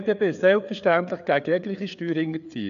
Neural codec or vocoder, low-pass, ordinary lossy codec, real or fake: codec, 16 kHz, 4 kbps, FreqCodec, larger model; 7.2 kHz; none; fake